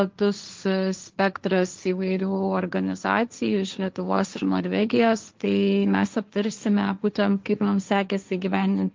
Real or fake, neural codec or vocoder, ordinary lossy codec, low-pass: fake; codec, 16 kHz, 1.1 kbps, Voila-Tokenizer; Opus, 32 kbps; 7.2 kHz